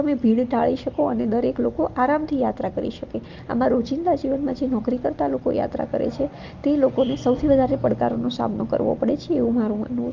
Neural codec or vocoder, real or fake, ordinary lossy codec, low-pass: none; real; Opus, 24 kbps; 7.2 kHz